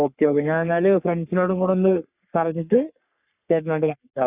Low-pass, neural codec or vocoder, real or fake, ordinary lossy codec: 3.6 kHz; codec, 44.1 kHz, 3.4 kbps, Pupu-Codec; fake; Opus, 64 kbps